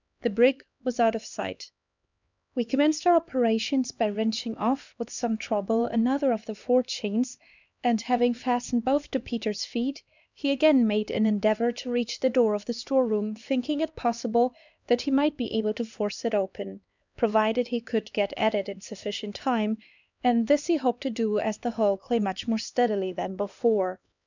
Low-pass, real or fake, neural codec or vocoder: 7.2 kHz; fake; codec, 16 kHz, 2 kbps, X-Codec, HuBERT features, trained on LibriSpeech